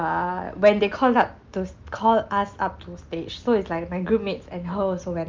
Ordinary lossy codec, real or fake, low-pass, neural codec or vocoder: Opus, 32 kbps; real; 7.2 kHz; none